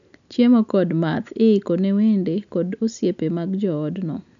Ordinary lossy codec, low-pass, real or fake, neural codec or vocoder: none; 7.2 kHz; real; none